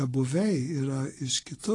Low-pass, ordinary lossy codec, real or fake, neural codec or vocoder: 10.8 kHz; AAC, 32 kbps; real; none